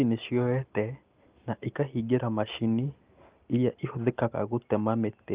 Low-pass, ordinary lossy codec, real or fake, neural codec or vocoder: 3.6 kHz; Opus, 32 kbps; real; none